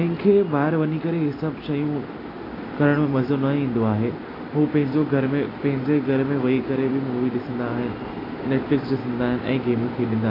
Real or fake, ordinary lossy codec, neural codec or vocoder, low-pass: real; AAC, 24 kbps; none; 5.4 kHz